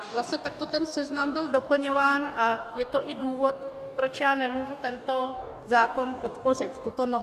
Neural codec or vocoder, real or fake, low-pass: codec, 44.1 kHz, 2.6 kbps, DAC; fake; 14.4 kHz